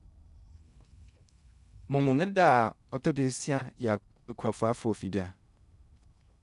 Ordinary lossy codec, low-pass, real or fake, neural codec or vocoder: none; 10.8 kHz; fake; codec, 16 kHz in and 24 kHz out, 0.6 kbps, FocalCodec, streaming, 2048 codes